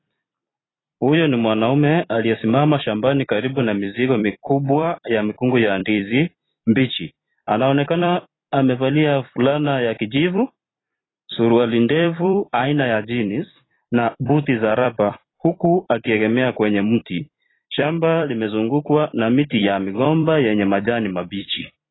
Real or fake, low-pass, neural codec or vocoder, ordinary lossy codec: fake; 7.2 kHz; vocoder, 44.1 kHz, 128 mel bands every 256 samples, BigVGAN v2; AAC, 16 kbps